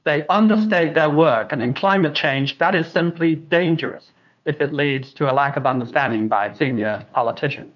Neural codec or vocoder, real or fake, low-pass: codec, 16 kHz, 2 kbps, FunCodec, trained on LibriTTS, 25 frames a second; fake; 7.2 kHz